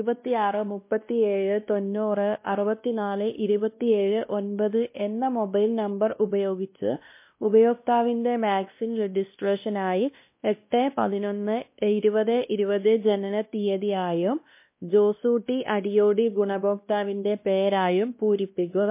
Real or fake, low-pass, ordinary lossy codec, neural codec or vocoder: fake; 3.6 kHz; MP3, 24 kbps; codec, 16 kHz, 1 kbps, X-Codec, WavLM features, trained on Multilingual LibriSpeech